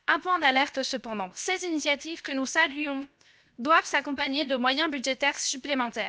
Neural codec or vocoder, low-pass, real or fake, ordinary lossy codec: codec, 16 kHz, 0.7 kbps, FocalCodec; none; fake; none